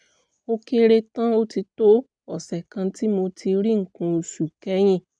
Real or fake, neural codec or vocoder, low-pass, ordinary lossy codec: real; none; 9.9 kHz; none